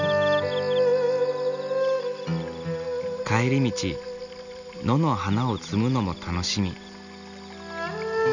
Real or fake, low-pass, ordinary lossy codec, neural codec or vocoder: real; 7.2 kHz; none; none